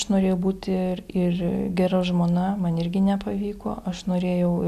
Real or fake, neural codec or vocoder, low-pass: real; none; 14.4 kHz